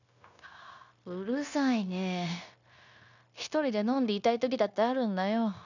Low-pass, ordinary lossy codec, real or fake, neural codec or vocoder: 7.2 kHz; none; fake; codec, 16 kHz, 0.9 kbps, LongCat-Audio-Codec